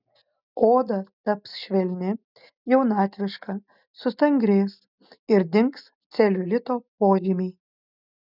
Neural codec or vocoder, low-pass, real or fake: none; 5.4 kHz; real